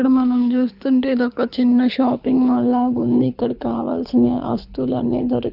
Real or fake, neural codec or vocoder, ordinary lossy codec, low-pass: fake; codec, 24 kHz, 6 kbps, HILCodec; none; 5.4 kHz